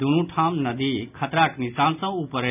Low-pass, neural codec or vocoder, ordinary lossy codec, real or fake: 3.6 kHz; none; none; real